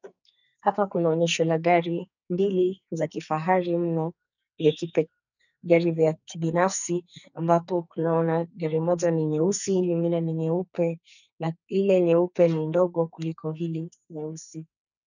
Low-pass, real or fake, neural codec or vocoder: 7.2 kHz; fake; codec, 44.1 kHz, 2.6 kbps, SNAC